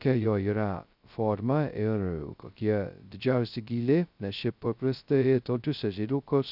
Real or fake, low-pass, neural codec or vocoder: fake; 5.4 kHz; codec, 16 kHz, 0.2 kbps, FocalCodec